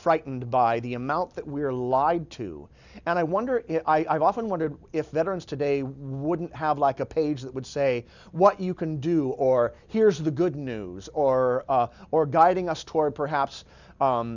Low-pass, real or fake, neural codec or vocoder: 7.2 kHz; real; none